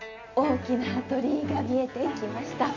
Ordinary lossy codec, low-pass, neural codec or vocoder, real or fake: none; 7.2 kHz; none; real